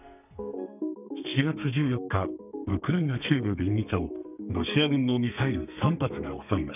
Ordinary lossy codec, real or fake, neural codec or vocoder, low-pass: none; fake; codec, 32 kHz, 1.9 kbps, SNAC; 3.6 kHz